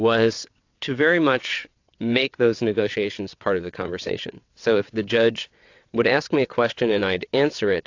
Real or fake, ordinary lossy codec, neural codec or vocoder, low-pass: fake; AAC, 48 kbps; vocoder, 22.05 kHz, 80 mel bands, WaveNeXt; 7.2 kHz